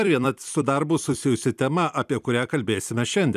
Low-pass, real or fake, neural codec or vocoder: 14.4 kHz; fake; vocoder, 44.1 kHz, 128 mel bands every 256 samples, BigVGAN v2